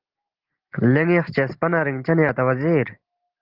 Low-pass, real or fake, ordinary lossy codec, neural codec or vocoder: 5.4 kHz; real; Opus, 16 kbps; none